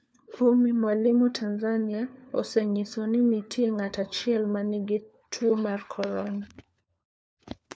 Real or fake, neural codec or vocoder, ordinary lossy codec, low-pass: fake; codec, 16 kHz, 4 kbps, FunCodec, trained on LibriTTS, 50 frames a second; none; none